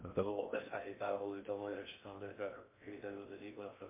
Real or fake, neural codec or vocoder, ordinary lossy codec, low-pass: fake; codec, 16 kHz in and 24 kHz out, 0.6 kbps, FocalCodec, streaming, 4096 codes; MP3, 24 kbps; 3.6 kHz